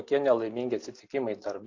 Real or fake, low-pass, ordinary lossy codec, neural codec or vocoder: real; 7.2 kHz; AAC, 48 kbps; none